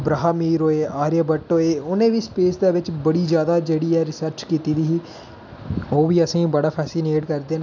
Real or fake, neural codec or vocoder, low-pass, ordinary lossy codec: real; none; 7.2 kHz; none